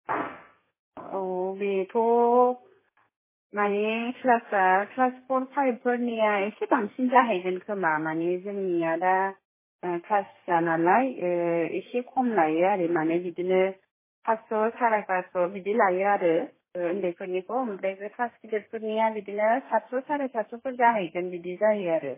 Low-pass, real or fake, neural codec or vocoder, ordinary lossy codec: 3.6 kHz; fake; codec, 32 kHz, 1.9 kbps, SNAC; MP3, 16 kbps